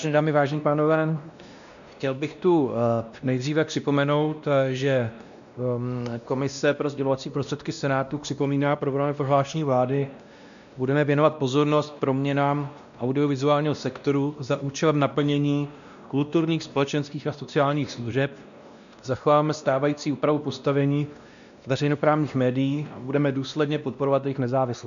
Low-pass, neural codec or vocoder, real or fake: 7.2 kHz; codec, 16 kHz, 1 kbps, X-Codec, WavLM features, trained on Multilingual LibriSpeech; fake